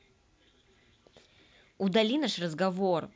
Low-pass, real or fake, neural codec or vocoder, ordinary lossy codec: none; real; none; none